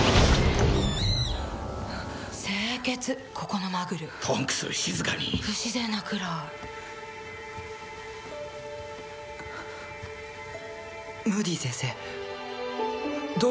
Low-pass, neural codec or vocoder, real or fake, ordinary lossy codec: none; none; real; none